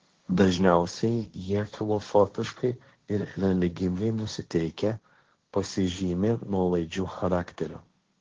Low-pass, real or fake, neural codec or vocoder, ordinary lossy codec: 7.2 kHz; fake; codec, 16 kHz, 1.1 kbps, Voila-Tokenizer; Opus, 16 kbps